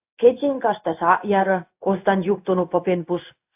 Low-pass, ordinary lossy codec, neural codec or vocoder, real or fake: 3.6 kHz; AAC, 32 kbps; codec, 16 kHz in and 24 kHz out, 1 kbps, XY-Tokenizer; fake